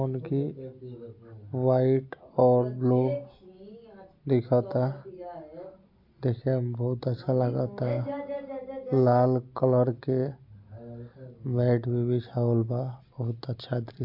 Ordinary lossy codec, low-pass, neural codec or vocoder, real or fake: AAC, 48 kbps; 5.4 kHz; none; real